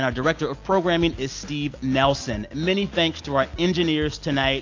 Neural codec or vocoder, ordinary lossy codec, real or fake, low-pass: none; AAC, 48 kbps; real; 7.2 kHz